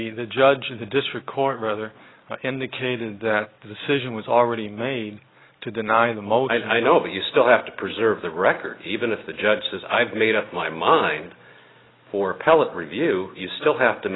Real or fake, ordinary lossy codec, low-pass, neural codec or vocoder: real; AAC, 16 kbps; 7.2 kHz; none